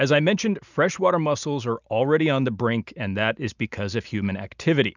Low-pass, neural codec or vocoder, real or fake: 7.2 kHz; none; real